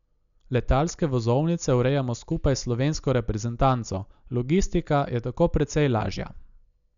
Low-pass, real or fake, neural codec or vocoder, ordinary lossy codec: 7.2 kHz; real; none; none